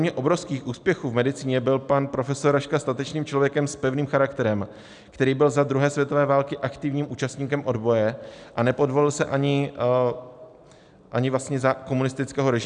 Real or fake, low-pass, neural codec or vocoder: real; 9.9 kHz; none